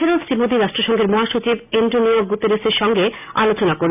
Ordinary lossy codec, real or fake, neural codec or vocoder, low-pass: none; real; none; 3.6 kHz